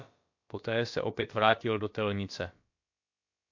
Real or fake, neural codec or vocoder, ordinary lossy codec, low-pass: fake; codec, 16 kHz, about 1 kbps, DyCAST, with the encoder's durations; MP3, 48 kbps; 7.2 kHz